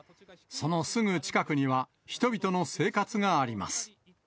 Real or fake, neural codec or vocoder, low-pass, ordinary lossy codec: real; none; none; none